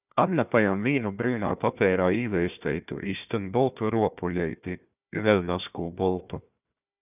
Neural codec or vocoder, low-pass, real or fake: codec, 16 kHz, 1 kbps, FunCodec, trained on Chinese and English, 50 frames a second; 3.6 kHz; fake